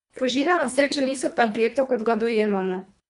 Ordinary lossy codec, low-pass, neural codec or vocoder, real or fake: none; 10.8 kHz; codec, 24 kHz, 1.5 kbps, HILCodec; fake